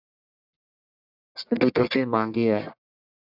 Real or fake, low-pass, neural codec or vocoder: fake; 5.4 kHz; codec, 44.1 kHz, 1.7 kbps, Pupu-Codec